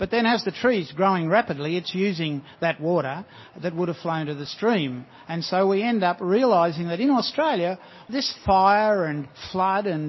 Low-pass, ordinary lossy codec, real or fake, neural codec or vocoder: 7.2 kHz; MP3, 24 kbps; real; none